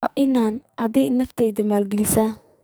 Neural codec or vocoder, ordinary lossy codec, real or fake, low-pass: codec, 44.1 kHz, 2.6 kbps, SNAC; none; fake; none